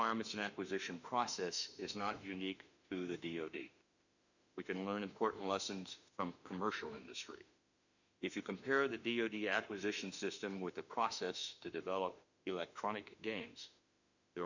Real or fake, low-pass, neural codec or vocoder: fake; 7.2 kHz; autoencoder, 48 kHz, 32 numbers a frame, DAC-VAE, trained on Japanese speech